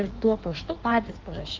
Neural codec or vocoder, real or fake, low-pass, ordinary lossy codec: codec, 16 kHz in and 24 kHz out, 1.1 kbps, FireRedTTS-2 codec; fake; 7.2 kHz; Opus, 16 kbps